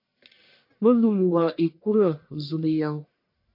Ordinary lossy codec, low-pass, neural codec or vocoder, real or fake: MP3, 32 kbps; 5.4 kHz; codec, 44.1 kHz, 1.7 kbps, Pupu-Codec; fake